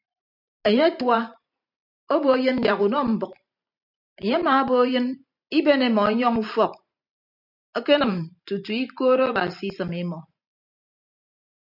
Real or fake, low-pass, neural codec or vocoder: fake; 5.4 kHz; vocoder, 44.1 kHz, 128 mel bands every 512 samples, BigVGAN v2